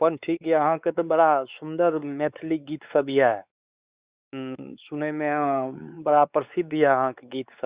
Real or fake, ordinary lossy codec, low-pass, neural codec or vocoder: fake; Opus, 64 kbps; 3.6 kHz; codec, 16 kHz, 4 kbps, X-Codec, WavLM features, trained on Multilingual LibriSpeech